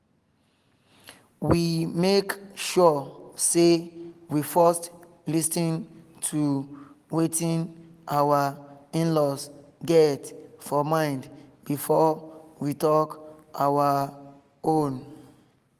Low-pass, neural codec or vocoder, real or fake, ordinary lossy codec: 14.4 kHz; none; real; Opus, 24 kbps